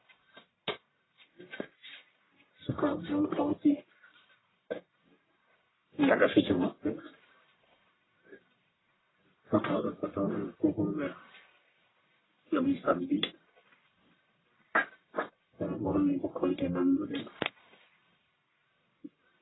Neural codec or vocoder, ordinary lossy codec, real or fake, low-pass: codec, 44.1 kHz, 1.7 kbps, Pupu-Codec; AAC, 16 kbps; fake; 7.2 kHz